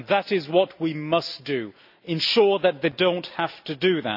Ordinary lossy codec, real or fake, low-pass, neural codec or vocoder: AAC, 48 kbps; real; 5.4 kHz; none